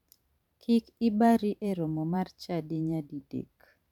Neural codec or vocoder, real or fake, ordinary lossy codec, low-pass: vocoder, 44.1 kHz, 128 mel bands every 512 samples, BigVGAN v2; fake; none; 19.8 kHz